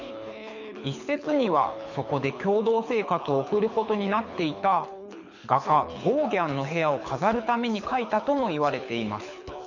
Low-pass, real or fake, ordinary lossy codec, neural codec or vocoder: 7.2 kHz; fake; none; codec, 24 kHz, 6 kbps, HILCodec